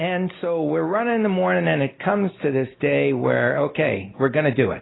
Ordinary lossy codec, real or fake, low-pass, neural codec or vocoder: AAC, 16 kbps; real; 7.2 kHz; none